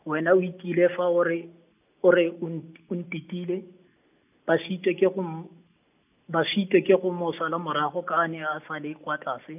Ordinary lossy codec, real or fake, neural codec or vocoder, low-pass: none; real; none; 3.6 kHz